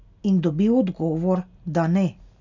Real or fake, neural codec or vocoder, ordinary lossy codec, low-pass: real; none; none; 7.2 kHz